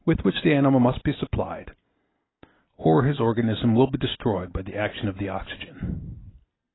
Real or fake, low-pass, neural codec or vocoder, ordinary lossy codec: real; 7.2 kHz; none; AAC, 16 kbps